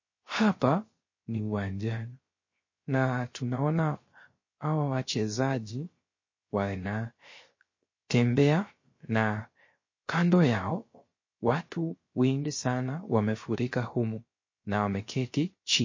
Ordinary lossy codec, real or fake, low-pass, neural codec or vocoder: MP3, 32 kbps; fake; 7.2 kHz; codec, 16 kHz, 0.3 kbps, FocalCodec